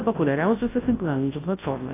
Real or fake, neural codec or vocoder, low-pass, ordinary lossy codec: fake; codec, 24 kHz, 0.9 kbps, WavTokenizer, large speech release; 3.6 kHz; AAC, 16 kbps